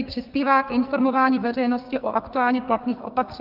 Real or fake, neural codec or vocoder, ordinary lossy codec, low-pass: fake; codec, 44.1 kHz, 2.6 kbps, SNAC; Opus, 24 kbps; 5.4 kHz